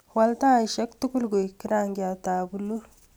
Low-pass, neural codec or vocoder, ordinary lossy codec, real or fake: none; none; none; real